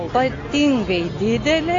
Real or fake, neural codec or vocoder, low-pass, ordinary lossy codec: real; none; 7.2 kHz; AAC, 32 kbps